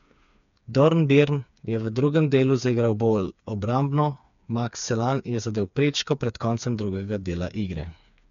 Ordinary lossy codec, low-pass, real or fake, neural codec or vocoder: none; 7.2 kHz; fake; codec, 16 kHz, 4 kbps, FreqCodec, smaller model